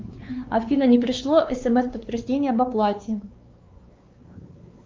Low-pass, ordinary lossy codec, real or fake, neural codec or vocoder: 7.2 kHz; Opus, 24 kbps; fake; codec, 16 kHz, 4 kbps, X-Codec, WavLM features, trained on Multilingual LibriSpeech